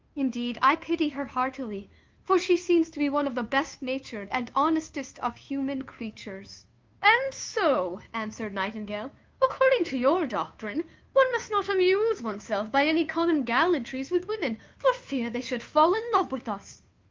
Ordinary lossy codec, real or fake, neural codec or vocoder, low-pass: Opus, 24 kbps; fake; codec, 16 kHz, 2 kbps, FunCodec, trained on Chinese and English, 25 frames a second; 7.2 kHz